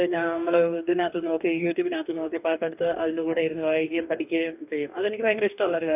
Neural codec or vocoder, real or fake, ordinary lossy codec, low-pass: codec, 44.1 kHz, 2.6 kbps, DAC; fake; none; 3.6 kHz